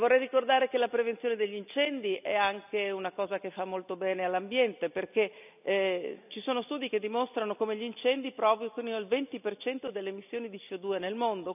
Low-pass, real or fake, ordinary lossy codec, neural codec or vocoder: 3.6 kHz; real; none; none